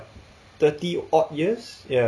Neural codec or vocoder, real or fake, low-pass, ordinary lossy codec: none; real; none; none